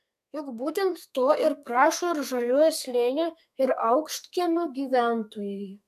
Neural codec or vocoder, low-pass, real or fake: codec, 32 kHz, 1.9 kbps, SNAC; 14.4 kHz; fake